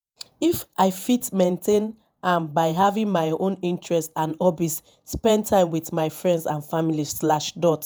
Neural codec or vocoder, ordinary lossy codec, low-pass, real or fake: vocoder, 48 kHz, 128 mel bands, Vocos; none; none; fake